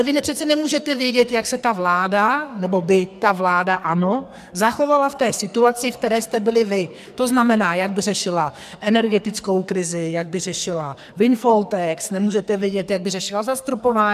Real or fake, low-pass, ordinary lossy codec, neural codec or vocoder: fake; 14.4 kHz; MP3, 96 kbps; codec, 44.1 kHz, 2.6 kbps, SNAC